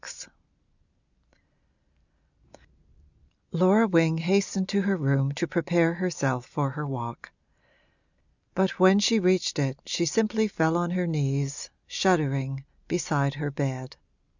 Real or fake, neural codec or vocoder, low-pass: real; none; 7.2 kHz